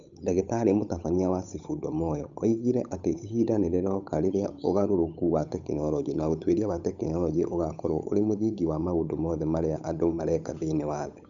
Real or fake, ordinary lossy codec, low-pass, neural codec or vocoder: fake; none; 7.2 kHz; codec, 16 kHz, 8 kbps, FunCodec, trained on Chinese and English, 25 frames a second